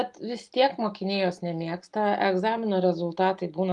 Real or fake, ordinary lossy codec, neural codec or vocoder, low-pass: real; Opus, 32 kbps; none; 10.8 kHz